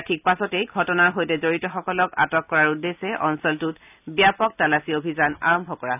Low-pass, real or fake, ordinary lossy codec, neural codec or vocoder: 3.6 kHz; real; none; none